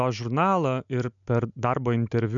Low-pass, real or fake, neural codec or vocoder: 7.2 kHz; real; none